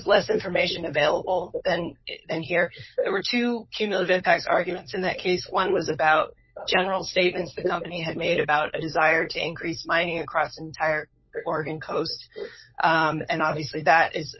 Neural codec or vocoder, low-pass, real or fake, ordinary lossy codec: codec, 16 kHz, 16 kbps, FunCodec, trained on LibriTTS, 50 frames a second; 7.2 kHz; fake; MP3, 24 kbps